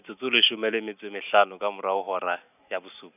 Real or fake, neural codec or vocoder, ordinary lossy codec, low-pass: real; none; none; 3.6 kHz